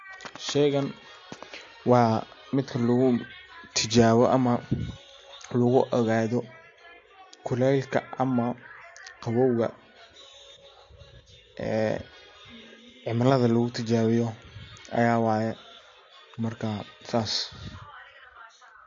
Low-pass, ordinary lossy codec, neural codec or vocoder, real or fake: 7.2 kHz; AAC, 48 kbps; none; real